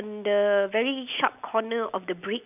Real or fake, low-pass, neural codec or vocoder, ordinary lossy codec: real; 3.6 kHz; none; none